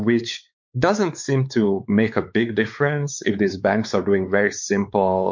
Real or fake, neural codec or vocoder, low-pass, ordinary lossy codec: fake; codec, 16 kHz, 4 kbps, X-Codec, WavLM features, trained on Multilingual LibriSpeech; 7.2 kHz; MP3, 48 kbps